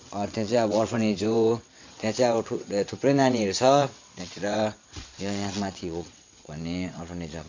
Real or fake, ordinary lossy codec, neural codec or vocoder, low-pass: fake; MP3, 48 kbps; vocoder, 22.05 kHz, 80 mel bands, WaveNeXt; 7.2 kHz